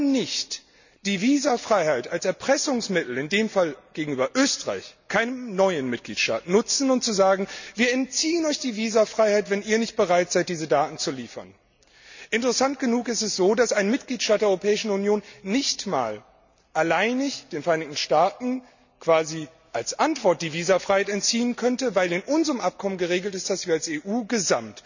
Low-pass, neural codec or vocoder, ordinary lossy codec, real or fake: 7.2 kHz; none; none; real